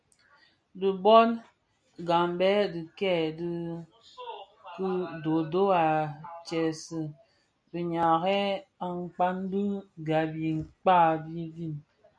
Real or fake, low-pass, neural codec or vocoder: real; 9.9 kHz; none